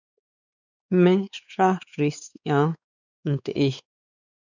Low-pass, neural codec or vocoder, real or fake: 7.2 kHz; codec, 16 kHz, 4 kbps, X-Codec, WavLM features, trained on Multilingual LibriSpeech; fake